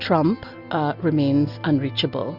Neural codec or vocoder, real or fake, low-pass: none; real; 5.4 kHz